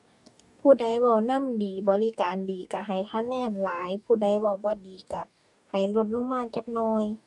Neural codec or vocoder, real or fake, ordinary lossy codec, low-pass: codec, 44.1 kHz, 2.6 kbps, DAC; fake; none; 10.8 kHz